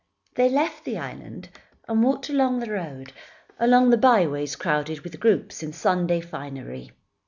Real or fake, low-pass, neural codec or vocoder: real; 7.2 kHz; none